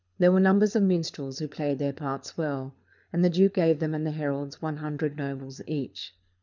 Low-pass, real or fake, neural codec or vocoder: 7.2 kHz; fake; codec, 24 kHz, 6 kbps, HILCodec